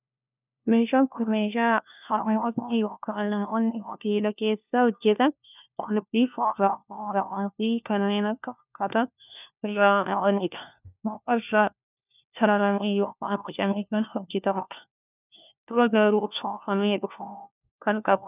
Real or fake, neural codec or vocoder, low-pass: fake; codec, 16 kHz, 1 kbps, FunCodec, trained on LibriTTS, 50 frames a second; 3.6 kHz